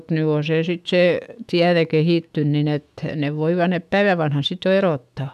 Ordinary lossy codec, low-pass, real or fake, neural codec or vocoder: none; 14.4 kHz; fake; autoencoder, 48 kHz, 128 numbers a frame, DAC-VAE, trained on Japanese speech